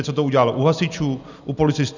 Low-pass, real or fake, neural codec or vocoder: 7.2 kHz; real; none